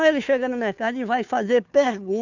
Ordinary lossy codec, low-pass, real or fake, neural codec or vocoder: none; 7.2 kHz; fake; codec, 16 kHz, 2 kbps, FunCodec, trained on Chinese and English, 25 frames a second